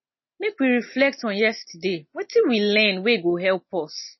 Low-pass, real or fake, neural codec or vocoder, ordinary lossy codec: 7.2 kHz; real; none; MP3, 24 kbps